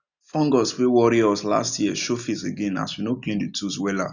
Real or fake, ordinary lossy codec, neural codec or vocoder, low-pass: real; none; none; 7.2 kHz